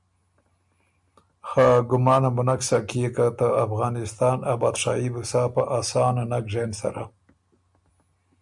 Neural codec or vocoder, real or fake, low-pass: none; real; 10.8 kHz